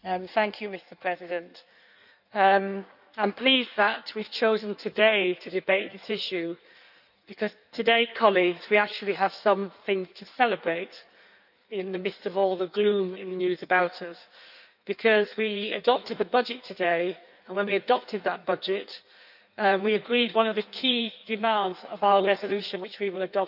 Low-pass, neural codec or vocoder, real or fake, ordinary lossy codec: 5.4 kHz; codec, 16 kHz in and 24 kHz out, 1.1 kbps, FireRedTTS-2 codec; fake; none